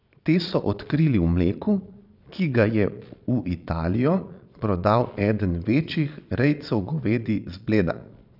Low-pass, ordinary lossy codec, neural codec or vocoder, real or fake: 5.4 kHz; none; vocoder, 22.05 kHz, 80 mel bands, Vocos; fake